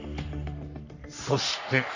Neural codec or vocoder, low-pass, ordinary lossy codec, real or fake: codec, 44.1 kHz, 3.4 kbps, Pupu-Codec; 7.2 kHz; AAC, 48 kbps; fake